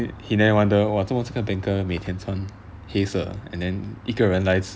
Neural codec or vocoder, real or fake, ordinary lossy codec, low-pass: none; real; none; none